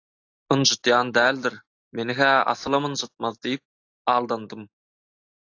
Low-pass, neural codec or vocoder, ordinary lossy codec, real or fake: 7.2 kHz; none; AAC, 48 kbps; real